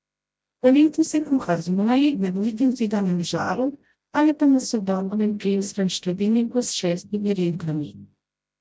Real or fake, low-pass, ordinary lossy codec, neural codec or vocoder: fake; none; none; codec, 16 kHz, 0.5 kbps, FreqCodec, smaller model